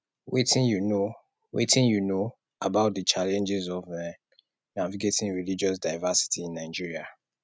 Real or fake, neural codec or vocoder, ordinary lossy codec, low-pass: real; none; none; none